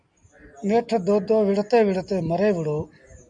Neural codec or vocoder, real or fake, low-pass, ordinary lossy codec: none; real; 9.9 kHz; MP3, 96 kbps